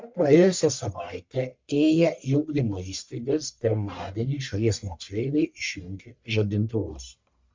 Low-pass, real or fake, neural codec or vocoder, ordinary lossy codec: 7.2 kHz; fake; codec, 44.1 kHz, 1.7 kbps, Pupu-Codec; MP3, 64 kbps